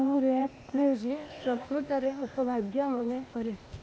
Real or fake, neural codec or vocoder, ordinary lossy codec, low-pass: fake; codec, 16 kHz, 0.8 kbps, ZipCodec; none; none